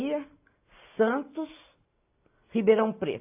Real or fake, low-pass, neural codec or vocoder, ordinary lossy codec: real; 3.6 kHz; none; AAC, 32 kbps